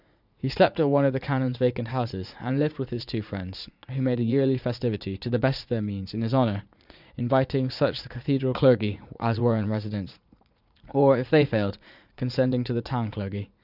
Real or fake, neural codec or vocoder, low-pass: fake; vocoder, 44.1 kHz, 80 mel bands, Vocos; 5.4 kHz